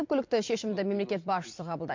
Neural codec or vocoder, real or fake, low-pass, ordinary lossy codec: vocoder, 44.1 kHz, 128 mel bands every 256 samples, BigVGAN v2; fake; 7.2 kHz; MP3, 48 kbps